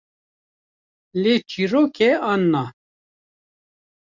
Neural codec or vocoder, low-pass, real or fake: none; 7.2 kHz; real